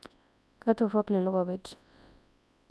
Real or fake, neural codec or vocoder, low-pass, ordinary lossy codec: fake; codec, 24 kHz, 0.9 kbps, WavTokenizer, large speech release; none; none